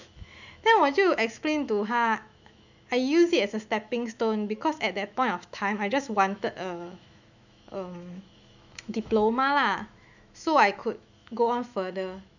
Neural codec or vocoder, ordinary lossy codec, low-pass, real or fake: autoencoder, 48 kHz, 128 numbers a frame, DAC-VAE, trained on Japanese speech; none; 7.2 kHz; fake